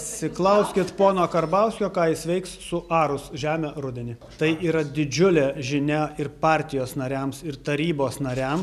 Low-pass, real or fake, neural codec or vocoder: 14.4 kHz; real; none